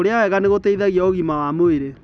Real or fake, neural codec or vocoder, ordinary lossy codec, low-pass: real; none; none; none